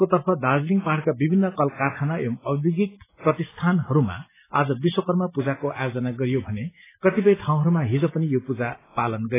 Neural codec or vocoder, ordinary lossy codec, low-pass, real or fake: none; AAC, 16 kbps; 3.6 kHz; real